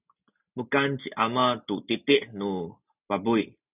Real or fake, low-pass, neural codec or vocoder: real; 3.6 kHz; none